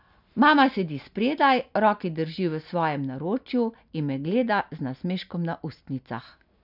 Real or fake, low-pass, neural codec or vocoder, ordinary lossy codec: real; 5.4 kHz; none; none